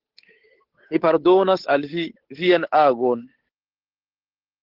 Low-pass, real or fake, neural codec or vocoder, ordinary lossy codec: 5.4 kHz; fake; codec, 16 kHz, 8 kbps, FunCodec, trained on Chinese and English, 25 frames a second; Opus, 16 kbps